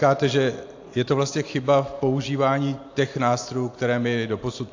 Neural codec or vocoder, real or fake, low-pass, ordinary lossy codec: none; real; 7.2 kHz; AAC, 48 kbps